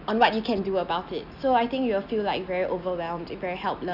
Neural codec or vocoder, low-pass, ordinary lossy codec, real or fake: none; 5.4 kHz; none; real